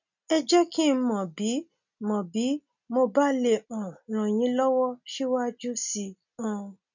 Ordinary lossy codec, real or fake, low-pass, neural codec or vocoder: none; real; 7.2 kHz; none